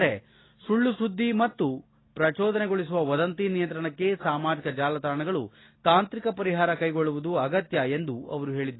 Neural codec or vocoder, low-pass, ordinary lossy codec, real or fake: none; 7.2 kHz; AAC, 16 kbps; real